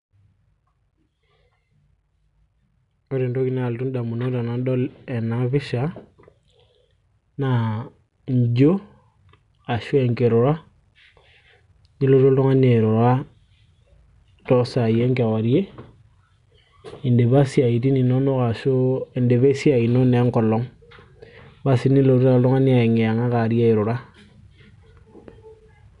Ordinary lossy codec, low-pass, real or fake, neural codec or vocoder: none; 10.8 kHz; real; none